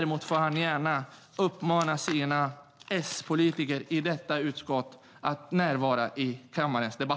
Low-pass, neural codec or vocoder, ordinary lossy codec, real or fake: none; none; none; real